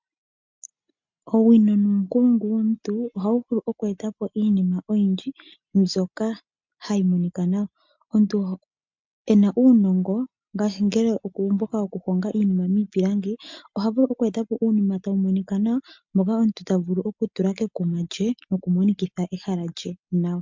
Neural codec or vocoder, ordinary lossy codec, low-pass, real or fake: none; MP3, 64 kbps; 7.2 kHz; real